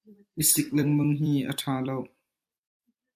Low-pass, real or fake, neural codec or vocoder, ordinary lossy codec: 14.4 kHz; fake; vocoder, 44.1 kHz, 128 mel bands every 256 samples, BigVGAN v2; AAC, 96 kbps